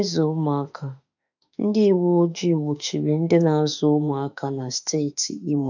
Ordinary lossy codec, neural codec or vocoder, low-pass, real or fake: none; autoencoder, 48 kHz, 32 numbers a frame, DAC-VAE, trained on Japanese speech; 7.2 kHz; fake